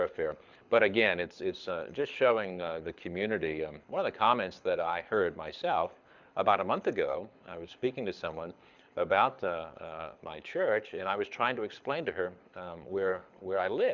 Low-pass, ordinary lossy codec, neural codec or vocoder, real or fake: 7.2 kHz; Opus, 64 kbps; codec, 24 kHz, 6 kbps, HILCodec; fake